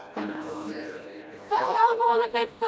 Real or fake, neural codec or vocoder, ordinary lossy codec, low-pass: fake; codec, 16 kHz, 1 kbps, FreqCodec, smaller model; none; none